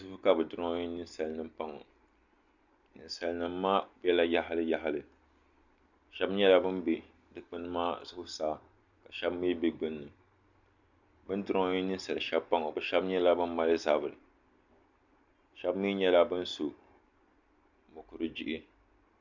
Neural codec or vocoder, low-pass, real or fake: none; 7.2 kHz; real